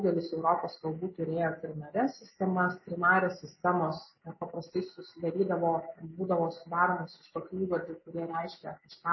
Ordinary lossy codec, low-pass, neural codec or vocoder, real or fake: MP3, 24 kbps; 7.2 kHz; none; real